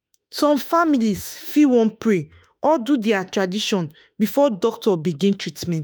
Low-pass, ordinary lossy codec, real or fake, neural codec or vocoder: none; none; fake; autoencoder, 48 kHz, 32 numbers a frame, DAC-VAE, trained on Japanese speech